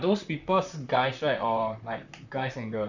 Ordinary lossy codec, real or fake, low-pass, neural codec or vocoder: none; fake; 7.2 kHz; vocoder, 44.1 kHz, 128 mel bands, Pupu-Vocoder